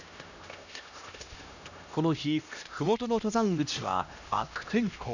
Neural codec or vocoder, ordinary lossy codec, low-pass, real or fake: codec, 16 kHz, 1 kbps, X-Codec, HuBERT features, trained on LibriSpeech; none; 7.2 kHz; fake